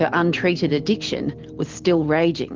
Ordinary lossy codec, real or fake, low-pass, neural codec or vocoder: Opus, 16 kbps; real; 7.2 kHz; none